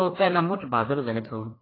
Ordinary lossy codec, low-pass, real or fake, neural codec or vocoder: AAC, 24 kbps; 5.4 kHz; fake; codec, 16 kHz, 2 kbps, FreqCodec, larger model